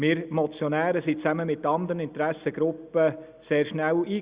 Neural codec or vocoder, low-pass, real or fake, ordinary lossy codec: none; 3.6 kHz; real; Opus, 64 kbps